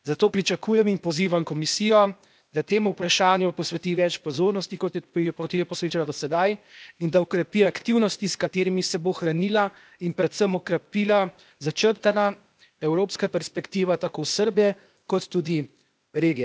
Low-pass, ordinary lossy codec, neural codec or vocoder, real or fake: none; none; codec, 16 kHz, 0.8 kbps, ZipCodec; fake